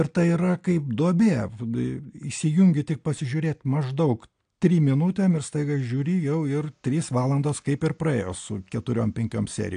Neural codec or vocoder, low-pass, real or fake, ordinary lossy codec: none; 9.9 kHz; real; AAC, 64 kbps